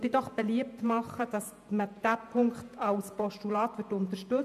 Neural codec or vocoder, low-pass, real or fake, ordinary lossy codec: vocoder, 48 kHz, 128 mel bands, Vocos; 14.4 kHz; fake; MP3, 96 kbps